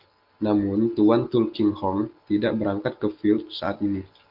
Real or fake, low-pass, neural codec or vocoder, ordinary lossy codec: real; 5.4 kHz; none; Opus, 32 kbps